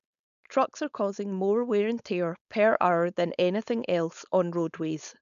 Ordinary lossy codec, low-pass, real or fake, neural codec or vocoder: none; 7.2 kHz; fake; codec, 16 kHz, 4.8 kbps, FACodec